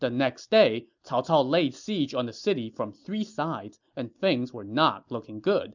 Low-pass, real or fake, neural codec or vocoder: 7.2 kHz; real; none